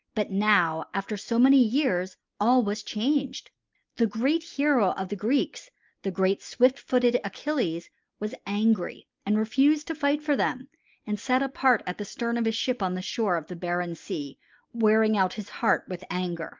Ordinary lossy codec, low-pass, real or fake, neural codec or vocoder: Opus, 24 kbps; 7.2 kHz; real; none